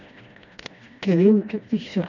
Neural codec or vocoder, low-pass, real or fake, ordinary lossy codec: codec, 16 kHz, 1 kbps, FreqCodec, smaller model; 7.2 kHz; fake; none